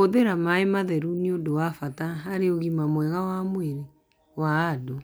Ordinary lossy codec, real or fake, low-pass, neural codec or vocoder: none; real; none; none